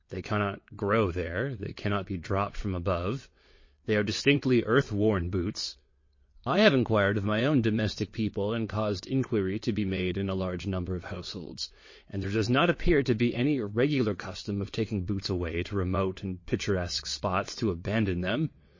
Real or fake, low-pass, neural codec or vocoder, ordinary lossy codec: fake; 7.2 kHz; vocoder, 22.05 kHz, 80 mel bands, WaveNeXt; MP3, 32 kbps